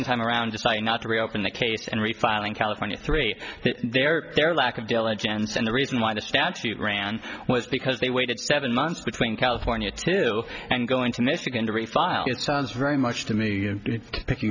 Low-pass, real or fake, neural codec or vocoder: 7.2 kHz; real; none